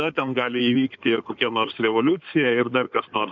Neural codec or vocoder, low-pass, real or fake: codec, 16 kHz in and 24 kHz out, 2.2 kbps, FireRedTTS-2 codec; 7.2 kHz; fake